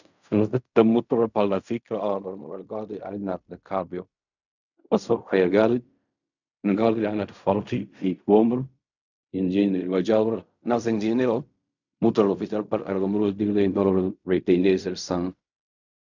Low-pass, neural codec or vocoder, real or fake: 7.2 kHz; codec, 16 kHz in and 24 kHz out, 0.4 kbps, LongCat-Audio-Codec, fine tuned four codebook decoder; fake